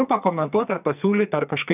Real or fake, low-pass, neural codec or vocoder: fake; 3.6 kHz; codec, 44.1 kHz, 2.6 kbps, SNAC